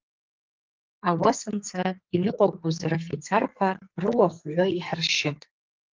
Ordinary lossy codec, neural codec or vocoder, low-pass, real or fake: Opus, 32 kbps; codec, 44.1 kHz, 2.6 kbps, SNAC; 7.2 kHz; fake